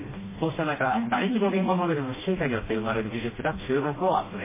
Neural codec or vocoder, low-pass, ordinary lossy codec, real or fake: codec, 16 kHz, 1 kbps, FreqCodec, smaller model; 3.6 kHz; MP3, 16 kbps; fake